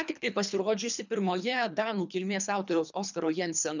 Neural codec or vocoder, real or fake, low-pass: codec, 24 kHz, 3 kbps, HILCodec; fake; 7.2 kHz